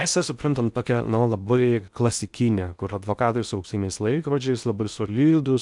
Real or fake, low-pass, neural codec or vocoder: fake; 10.8 kHz; codec, 16 kHz in and 24 kHz out, 0.6 kbps, FocalCodec, streaming, 2048 codes